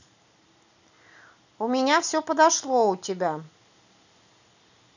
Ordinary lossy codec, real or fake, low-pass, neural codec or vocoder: none; real; 7.2 kHz; none